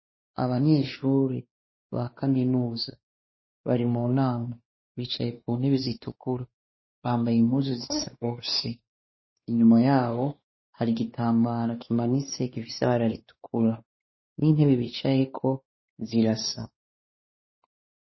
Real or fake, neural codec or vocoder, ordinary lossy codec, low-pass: fake; codec, 16 kHz, 2 kbps, X-Codec, WavLM features, trained on Multilingual LibriSpeech; MP3, 24 kbps; 7.2 kHz